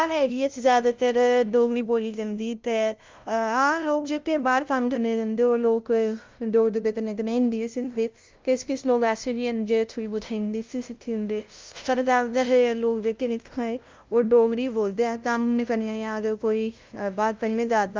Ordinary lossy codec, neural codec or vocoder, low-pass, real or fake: Opus, 24 kbps; codec, 16 kHz, 0.5 kbps, FunCodec, trained on LibriTTS, 25 frames a second; 7.2 kHz; fake